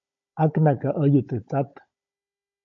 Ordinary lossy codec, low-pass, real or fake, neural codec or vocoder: MP3, 48 kbps; 7.2 kHz; fake; codec, 16 kHz, 16 kbps, FunCodec, trained on Chinese and English, 50 frames a second